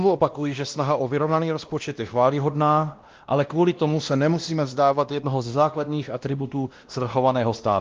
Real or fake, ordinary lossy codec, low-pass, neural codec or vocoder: fake; Opus, 32 kbps; 7.2 kHz; codec, 16 kHz, 1 kbps, X-Codec, WavLM features, trained on Multilingual LibriSpeech